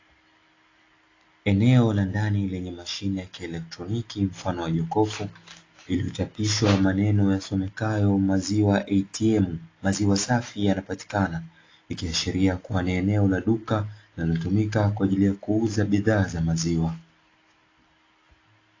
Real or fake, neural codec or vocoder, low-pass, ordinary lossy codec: real; none; 7.2 kHz; AAC, 32 kbps